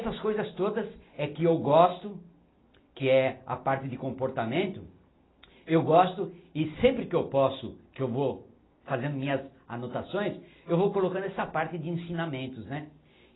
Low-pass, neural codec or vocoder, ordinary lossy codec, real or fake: 7.2 kHz; none; AAC, 16 kbps; real